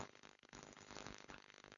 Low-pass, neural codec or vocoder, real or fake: 7.2 kHz; codec, 16 kHz, 4.8 kbps, FACodec; fake